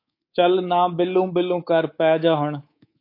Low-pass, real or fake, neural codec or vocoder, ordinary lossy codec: 5.4 kHz; fake; codec, 24 kHz, 3.1 kbps, DualCodec; AAC, 32 kbps